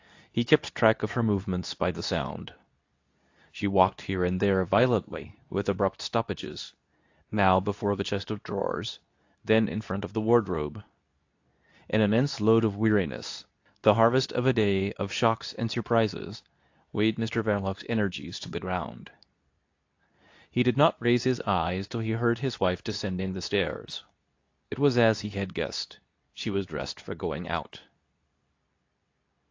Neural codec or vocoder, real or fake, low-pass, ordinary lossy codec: codec, 24 kHz, 0.9 kbps, WavTokenizer, medium speech release version 2; fake; 7.2 kHz; AAC, 48 kbps